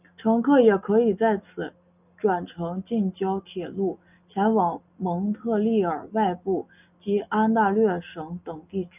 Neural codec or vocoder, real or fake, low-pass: none; real; 3.6 kHz